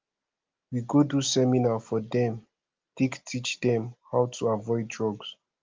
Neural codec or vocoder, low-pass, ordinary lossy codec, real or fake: none; 7.2 kHz; Opus, 32 kbps; real